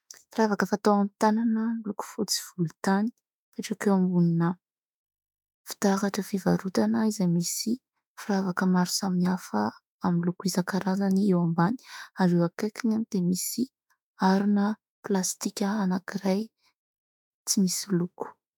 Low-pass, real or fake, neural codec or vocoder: 19.8 kHz; fake; autoencoder, 48 kHz, 32 numbers a frame, DAC-VAE, trained on Japanese speech